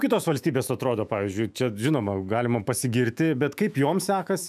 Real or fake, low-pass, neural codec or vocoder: fake; 14.4 kHz; autoencoder, 48 kHz, 128 numbers a frame, DAC-VAE, trained on Japanese speech